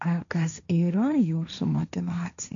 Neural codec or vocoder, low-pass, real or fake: codec, 16 kHz, 1.1 kbps, Voila-Tokenizer; 7.2 kHz; fake